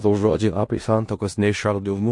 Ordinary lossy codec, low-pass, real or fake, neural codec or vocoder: MP3, 48 kbps; 10.8 kHz; fake; codec, 16 kHz in and 24 kHz out, 0.4 kbps, LongCat-Audio-Codec, four codebook decoder